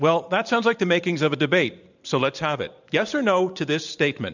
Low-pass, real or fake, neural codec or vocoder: 7.2 kHz; fake; vocoder, 44.1 kHz, 128 mel bands every 512 samples, BigVGAN v2